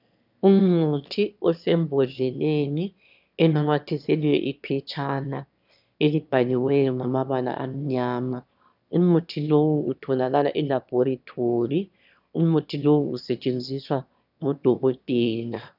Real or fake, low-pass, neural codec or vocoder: fake; 5.4 kHz; autoencoder, 22.05 kHz, a latent of 192 numbers a frame, VITS, trained on one speaker